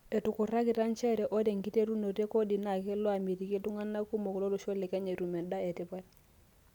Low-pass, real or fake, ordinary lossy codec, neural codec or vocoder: 19.8 kHz; real; none; none